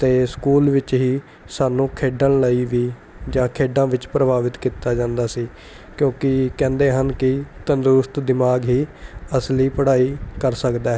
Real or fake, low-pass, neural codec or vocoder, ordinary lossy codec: real; none; none; none